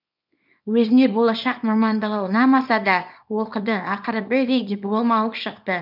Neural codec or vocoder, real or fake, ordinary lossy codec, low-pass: codec, 24 kHz, 0.9 kbps, WavTokenizer, small release; fake; none; 5.4 kHz